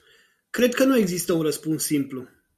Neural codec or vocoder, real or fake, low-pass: none; real; 14.4 kHz